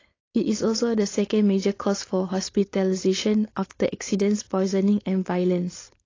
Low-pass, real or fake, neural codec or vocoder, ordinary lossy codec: 7.2 kHz; fake; codec, 16 kHz, 4.8 kbps, FACodec; AAC, 32 kbps